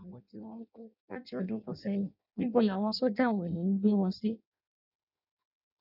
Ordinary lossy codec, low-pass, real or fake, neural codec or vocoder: none; 5.4 kHz; fake; codec, 16 kHz in and 24 kHz out, 0.6 kbps, FireRedTTS-2 codec